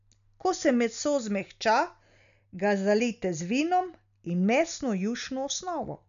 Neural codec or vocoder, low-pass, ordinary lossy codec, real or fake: none; 7.2 kHz; none; real